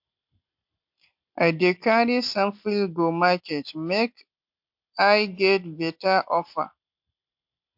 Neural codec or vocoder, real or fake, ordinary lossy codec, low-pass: none; real; MP3, 48 kbps; 5.4 kHz